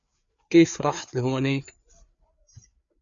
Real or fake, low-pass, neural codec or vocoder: fake; 7.2 kHz; codec, 16 kHz, 4 kbps, FreqCodec, larger model